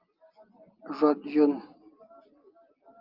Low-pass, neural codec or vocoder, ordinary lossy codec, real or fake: 5.4 kHz; none; Opus, 24 kbps; real